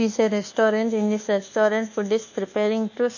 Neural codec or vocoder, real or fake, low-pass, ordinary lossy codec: codec, 24 kHz, 1.2 kbps, DualCodec; fake; 7.2 kHz; none